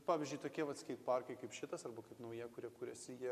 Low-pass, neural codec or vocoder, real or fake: 14.4 kHz; none; real